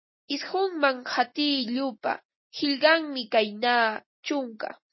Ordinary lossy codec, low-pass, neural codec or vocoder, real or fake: MP3, 24 kbps; 7.2 kHz; none; real